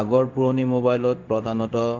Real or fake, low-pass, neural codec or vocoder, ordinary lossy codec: fake; 7.2 kHz; codec, 16 kHz in and 24 kHz out, 1 kbps, XY-Tokenizer; Opus, 32 kbps